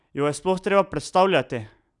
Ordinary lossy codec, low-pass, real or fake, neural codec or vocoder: none; 10.8 kHz; real; none